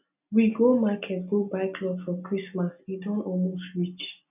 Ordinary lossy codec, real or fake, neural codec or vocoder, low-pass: none; real; none; 3.6 kHz